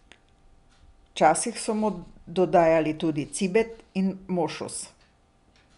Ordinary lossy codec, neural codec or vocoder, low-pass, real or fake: none; none; 10.8 kHz; real